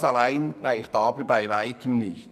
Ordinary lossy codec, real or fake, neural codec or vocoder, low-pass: none; fake; codec, 44.1 kHz, 2.6 kbps, SNAC; 14.4 kHz